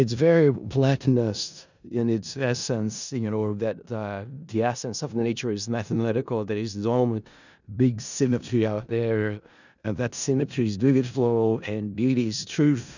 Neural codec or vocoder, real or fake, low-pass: codec, 16 kHz in and 24 kHz out, 0.4 kbps, LongCat-Audio-Codec, four codebook decoder; fake; 7.2 kHz